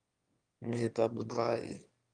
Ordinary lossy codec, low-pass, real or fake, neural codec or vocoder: Opus, 32 kbps; 9.9 kHz; fake; autoencoder, 22.05 kHz, a latent of 192 numbers a frame, VITS, trained on one speaker